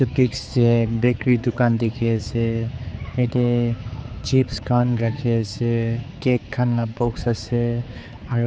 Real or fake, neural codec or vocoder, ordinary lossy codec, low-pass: fake; codec, 16 kHz, 4 kbps, X-Codec, HuBERT features, trained on general audio; none; none